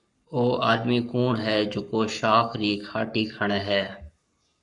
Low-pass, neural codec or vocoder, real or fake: 10.8 kHz; codec, 44.1 kHz, 7.8 kbps, Pupu-Codec; fake